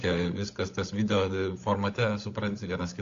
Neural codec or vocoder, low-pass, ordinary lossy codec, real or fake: codec, 16 kHz, 16 kbps, FunCodec, trained on LibriTTS, 50 frames a second; 7.2 kHz; AAC, 48 kbps; fake